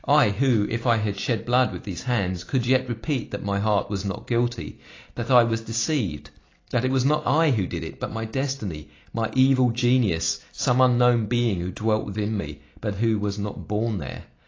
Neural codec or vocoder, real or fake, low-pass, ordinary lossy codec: none; real; 7.2 kHz; AAC, 32 kbps